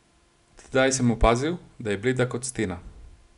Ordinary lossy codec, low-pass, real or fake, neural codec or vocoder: none; 10.8 kHz; real; none